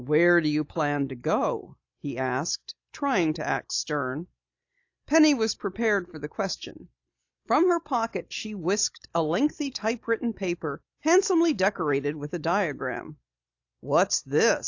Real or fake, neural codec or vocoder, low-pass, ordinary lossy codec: real; none; 7.2 kHz; AAC, 48 kbps